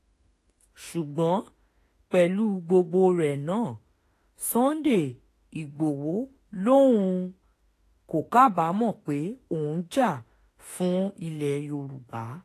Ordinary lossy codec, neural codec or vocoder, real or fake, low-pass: AAC, 48 kbps; autoencoder, 48 kHz, 32 numbers a frame, DAC-VAE, trained on Japanese speech; fake; 14.4 kHz